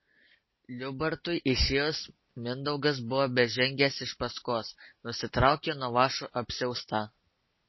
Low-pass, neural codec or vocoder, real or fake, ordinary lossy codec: 7.2 kHz; none; real; MP3, 24 kbps